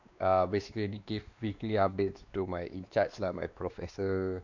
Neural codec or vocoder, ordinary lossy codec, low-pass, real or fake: codec, 16 kHz, 2 kbps, X-Codec, WavLM features, trained on Multilingual LibriSpeech; none; 7.2 kHz; fake